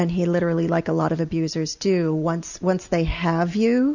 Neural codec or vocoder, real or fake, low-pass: none; real; 7.2 kHz